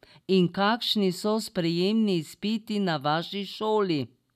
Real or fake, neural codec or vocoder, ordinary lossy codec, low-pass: real; none; none; 14.4 kHz